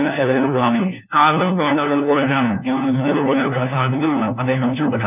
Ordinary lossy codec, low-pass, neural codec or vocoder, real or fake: none; 3.6 kHz; codec, 16 kHz, 1 kbps, FunCodec, trained on LibriTTS, 50 frames a second; fake